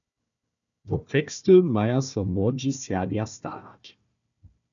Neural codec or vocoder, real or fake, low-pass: codec, 16 kHz, 1 kbps, FunCodec, trained on Chinese and English, 50 frames a second; fake; 7.2 kHz